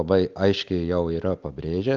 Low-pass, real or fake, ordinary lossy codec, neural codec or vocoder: 7.2 kHz; real; Opus, 32 kbps; none